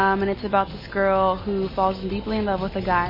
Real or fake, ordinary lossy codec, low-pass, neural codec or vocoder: real; MP3, 24 kbps; 5.4 kHz; none